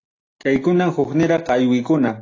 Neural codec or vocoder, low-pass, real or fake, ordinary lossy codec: none; 7.2 kHz; real; AAC, 32 kbps